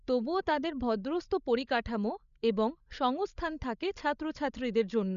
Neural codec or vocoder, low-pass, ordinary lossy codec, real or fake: codec, 16 kHz, 16 kbps, FreqCodec, larger model; 7.2 kHz; none; fake